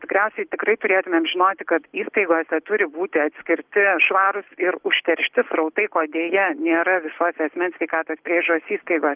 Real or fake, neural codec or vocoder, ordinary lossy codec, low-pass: real; none; Opus, 32 kbps; 3.6 kHz